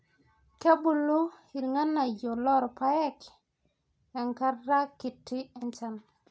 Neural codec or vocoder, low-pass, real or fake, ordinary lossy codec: none; none; real; none